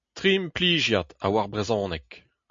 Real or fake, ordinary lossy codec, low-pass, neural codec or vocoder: real; MP3, 48 kbps; 7.2 kHz; none